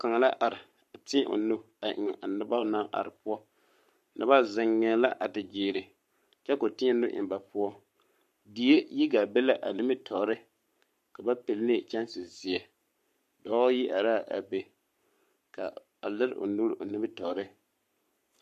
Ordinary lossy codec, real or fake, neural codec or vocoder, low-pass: MP3, 64 kbps; fake; codec, 44.1 kHz, 7.8 kbps, Pupu-Codec; 14.4 kHz